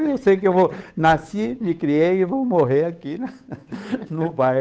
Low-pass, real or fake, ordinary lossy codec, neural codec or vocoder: none; fake; none; codec, 16 kHz, 8 kbps, FunCodec, trained on Chinese and English, 25 frames a second